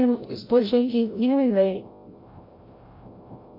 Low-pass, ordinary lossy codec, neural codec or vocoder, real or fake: 5.4 kHz; MP3, 32 kbps; codec, 16 kHz, 0.5 kbps, FreqCodec, larger model; fake